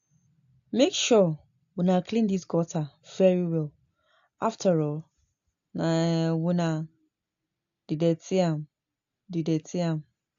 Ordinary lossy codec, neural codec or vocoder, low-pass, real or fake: AAC, 64 kbps; none; 7.2 kHz; real